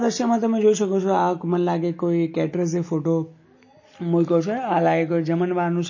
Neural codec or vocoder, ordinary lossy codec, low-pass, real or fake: none; MP3, 32 kbps; 7.2 kHz; real